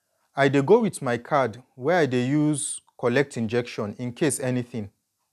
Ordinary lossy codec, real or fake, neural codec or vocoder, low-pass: none; real; none; 14.4 kHz